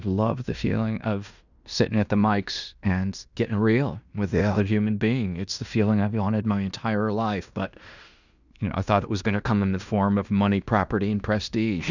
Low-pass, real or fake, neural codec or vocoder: 7.2 kHz; fake; codec, 16 kHz in and 24 kHz out, 0.9 kbps, LongCat-Audio-Codec, fine tuned four codebook decoder